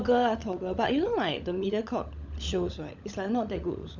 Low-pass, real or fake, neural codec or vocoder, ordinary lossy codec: 7.2 kHz; fake; codec, 16 kHz, 16 kbps, FunCodec, trained on LibriTTS, 50 frames a second; none